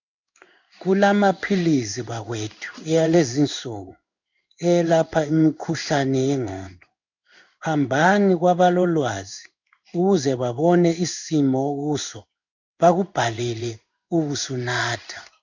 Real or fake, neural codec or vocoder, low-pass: fake; codec, 16 kHz in and 24 kHz out, 1 kbps, XY-Tokenizer; 7.2 kHz